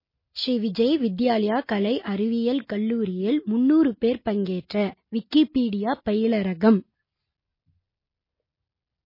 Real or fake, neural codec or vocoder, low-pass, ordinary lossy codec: real; none; 5.4 kHz; MP3, 24 kbps